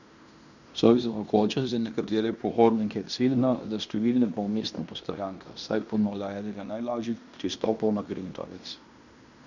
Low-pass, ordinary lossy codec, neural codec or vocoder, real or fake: 7.2 kHz; none; codec, 16 kHz in and 24 kHz out, 0.9 kbps, LongCat-Audio-Codec, fine tuned four codebook decoder; fake